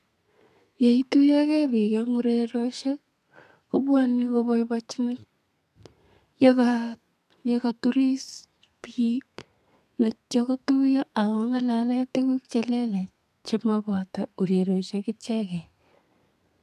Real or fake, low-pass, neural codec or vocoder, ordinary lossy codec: fake; 14.4 kHz; codec, 32 kHz, 1.9 kbps, SNAC; none